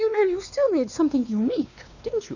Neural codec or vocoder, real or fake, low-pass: codec, 16 kHz, 2 kbps, X-Codec, WavLM features, trained on Multilingual LibriSpeech; fake; 7.2 kHz